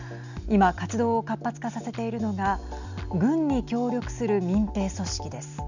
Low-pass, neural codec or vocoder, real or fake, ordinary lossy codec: 7.2 kHz; none; real; none